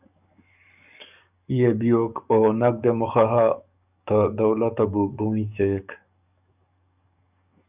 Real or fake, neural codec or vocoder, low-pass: fake; codec, 44.1 kHz, 7.8 kbps, DAC; 3.6 kHz